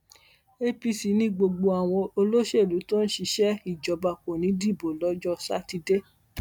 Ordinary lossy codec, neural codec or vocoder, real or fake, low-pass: none; none; real; 19.8 kHz